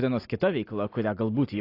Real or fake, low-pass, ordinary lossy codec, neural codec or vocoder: real; 5.4 kHz; AAC, 32 kbps; none